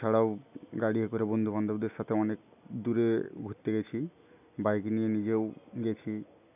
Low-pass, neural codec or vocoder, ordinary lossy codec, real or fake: 3.6 kHz; none; none; real